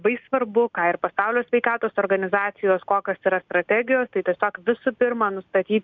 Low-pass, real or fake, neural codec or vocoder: 7.2 kHz; real; none